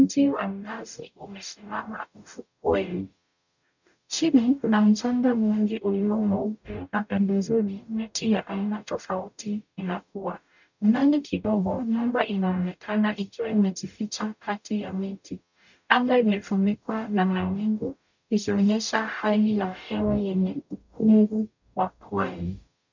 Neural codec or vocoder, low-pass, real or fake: codec, 44.1 kHz, 0.9 kbps, DAC; 7.2 kHz; fake